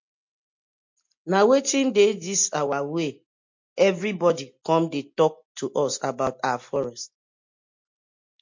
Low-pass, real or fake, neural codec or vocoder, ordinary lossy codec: 7.2 kHz; real; none; MP3, 48 kbps